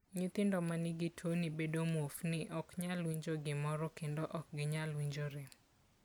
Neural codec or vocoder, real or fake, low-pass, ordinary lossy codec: none; real; none; none